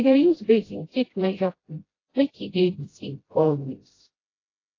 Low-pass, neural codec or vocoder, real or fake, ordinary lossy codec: 7.2 kHz; codec, 16 kHz, 0.5 kbps, FreqCodec, smaller model; fake; AAC, 32 kbps